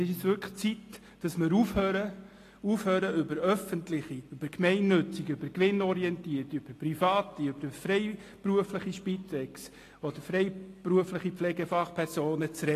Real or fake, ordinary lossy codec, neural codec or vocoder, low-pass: real; AAC, 48 kbps; none; 14.4 kHz